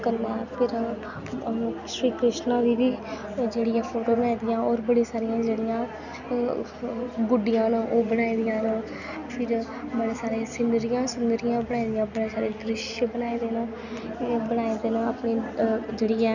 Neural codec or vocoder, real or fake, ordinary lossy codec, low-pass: none; real; none; 7.2 kHz